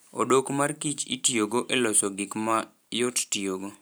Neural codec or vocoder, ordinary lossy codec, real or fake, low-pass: vocoder, 44.1 kHz, 128 mel bands every 512 samples, BigVGAN v2; none; fake; none